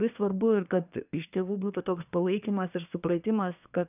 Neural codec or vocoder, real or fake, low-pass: codec, 24 kHz, 1 kbps, SNAC; fake; 3.6 kHz